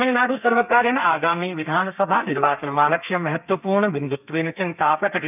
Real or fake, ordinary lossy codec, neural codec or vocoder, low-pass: fake; none; codec, 32 kHz, 1.9 kbps, SNAC; 3.6 kHz